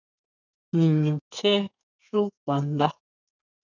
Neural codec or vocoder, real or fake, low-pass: codec, 32 kHz, 1.9 kbps, SNAC; fake; 7.2 kHz